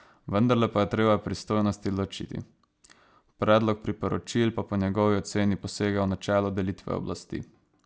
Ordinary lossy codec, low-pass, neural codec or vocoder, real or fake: none; none; none; real